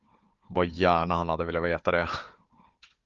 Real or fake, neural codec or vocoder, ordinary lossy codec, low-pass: fake; codec, 16 kHz, 4 kbps, FunCodec, trained on Chinese and English, 50 frames a second; Opus, 32 kbps; 7.2 kHz